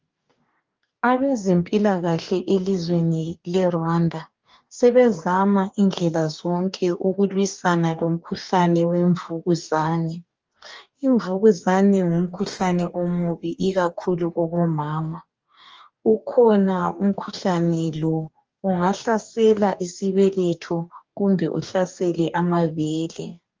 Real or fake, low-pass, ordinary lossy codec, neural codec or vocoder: fake; 7.2 kHz; Opus, 24 kbps; codec, 44.1 kHz, 2.6 kbps, DAC